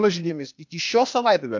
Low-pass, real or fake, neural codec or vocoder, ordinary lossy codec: 7.2 kHz; fake; codec, 16 kHz, 0.8 kbps, ZipCodec; MP3, 64 kbps